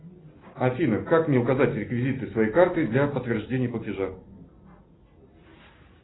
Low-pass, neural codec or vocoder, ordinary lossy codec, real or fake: 7.2 kHz; none; AAC, 16 kbps; real